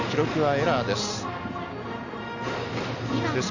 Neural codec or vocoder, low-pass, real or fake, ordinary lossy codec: none; 7.2 kHz; real; none